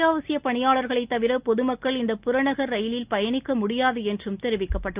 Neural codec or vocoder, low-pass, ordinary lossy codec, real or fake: none; 3.6 kHz; Opus, 24 kbps; real